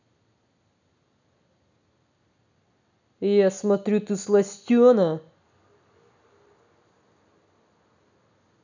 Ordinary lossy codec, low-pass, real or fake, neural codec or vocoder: none; 7.2 kHz; real; none